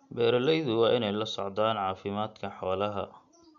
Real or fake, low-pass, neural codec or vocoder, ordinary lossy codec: real; 7.2 kHz; none; none